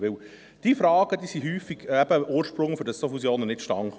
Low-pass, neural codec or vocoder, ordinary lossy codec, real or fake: none; none; none; real